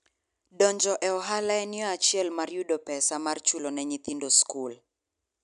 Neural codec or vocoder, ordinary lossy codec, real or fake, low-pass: none; none; real; 10.8 kHz